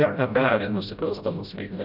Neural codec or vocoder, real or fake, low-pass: codec, 16 kHz, 0.5 kbps, FreqCodec, smaller model; fake; 5.4 kHz